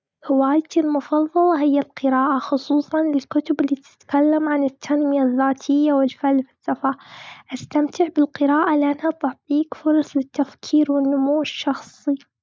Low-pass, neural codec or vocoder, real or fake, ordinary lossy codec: none; none; real; none